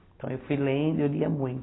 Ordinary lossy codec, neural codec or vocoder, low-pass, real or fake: AAC, 16 kbps; none; 7.2 kHz; real